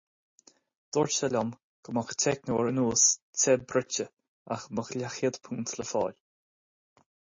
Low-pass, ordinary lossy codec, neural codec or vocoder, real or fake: 7.2 kHz; MP3, 32 kbps; none; real